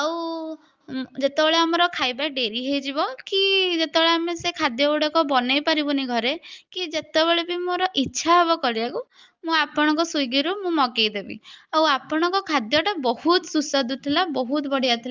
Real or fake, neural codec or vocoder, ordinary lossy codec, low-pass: real; none; Opus, 32 kbps; 7.2 kHz